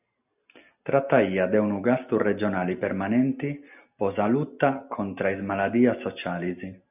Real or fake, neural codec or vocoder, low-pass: real; none; 3.6 kHz